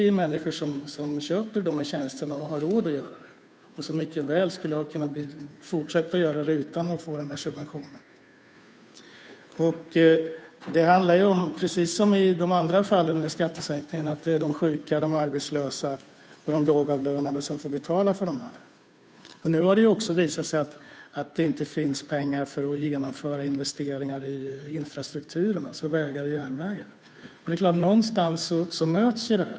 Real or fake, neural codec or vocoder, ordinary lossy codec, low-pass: fake; codec, 16 kHz, 2 kbps, FunCodec, trained on Chinese and English, 25 frames a second; none; none